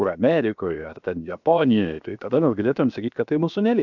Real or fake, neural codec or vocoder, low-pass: fake; codec, 16 kHz, 0.7 kbps, FocalCodec; 7.2 kHz